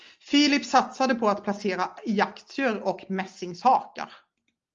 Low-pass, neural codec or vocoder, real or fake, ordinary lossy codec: 7.2 kHz; none; real; Opus, 32 kbps